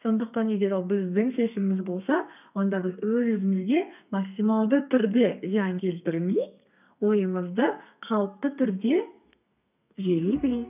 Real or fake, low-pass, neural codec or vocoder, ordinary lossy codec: fake; 3.6 kHz; codec, 32 kHz, 1.9 kbps, SNAC; none